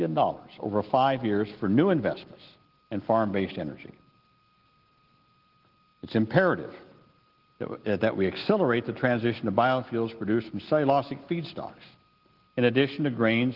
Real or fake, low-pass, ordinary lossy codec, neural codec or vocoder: real; 5.4 kHz; Opus, 16 kbps; none